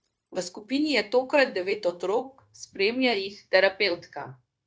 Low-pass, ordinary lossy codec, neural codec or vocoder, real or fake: none; none; codec, 16 kHz, 0.9 kbps, LongCat-Audio-Codec; fake